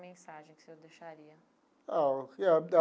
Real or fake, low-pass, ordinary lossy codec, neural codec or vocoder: real; none; none; none